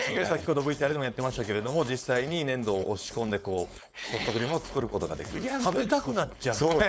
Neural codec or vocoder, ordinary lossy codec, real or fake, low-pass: codec, 16 kHz, 4.8 kbps, FACodec; none; fake; none